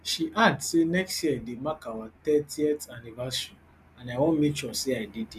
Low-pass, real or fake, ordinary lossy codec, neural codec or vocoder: 14.4 kHz; real; none; none